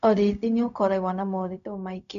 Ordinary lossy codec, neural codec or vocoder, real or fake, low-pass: none; codec, 16 kHz, 0.4 kbps, LongCat-Audio-Codec; fake; 7.2 kHz